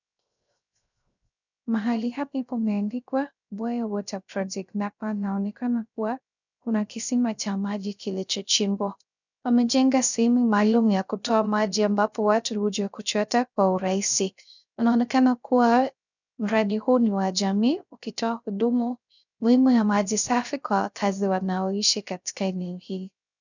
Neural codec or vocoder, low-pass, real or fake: codec, 16 kHz, 0.3 kbps, FocalCodec; 7.2 kHz; fake